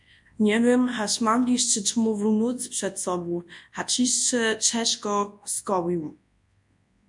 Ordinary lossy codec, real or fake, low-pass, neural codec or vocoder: MP3, 64 kbps; fake; 10.8 kHz; codec, 24 kHz, 0.9 kbps, WavTokenizer, large speech release